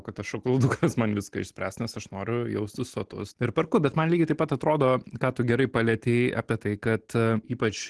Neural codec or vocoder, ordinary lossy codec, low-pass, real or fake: none; Opus, 16 kbps; 10.8 kHz; real